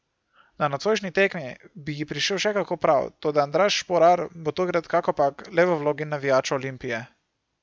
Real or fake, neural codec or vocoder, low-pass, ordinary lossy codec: real; none; none; none